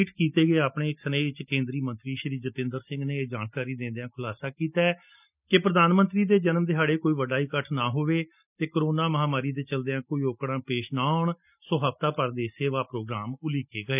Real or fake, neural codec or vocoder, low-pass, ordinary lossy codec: real; none; 3.6 kHz; none